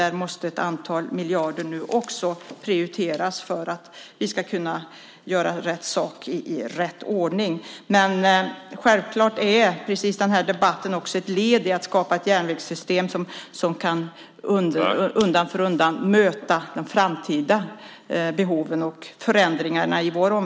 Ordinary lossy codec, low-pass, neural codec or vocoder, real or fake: none; none; none; real